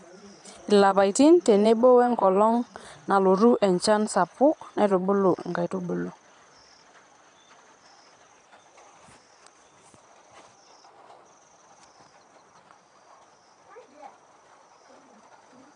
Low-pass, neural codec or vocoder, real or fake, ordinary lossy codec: 9.9 kHz; vocoder, 22.05 kHz, 80 mel bands, Vocos; fake; none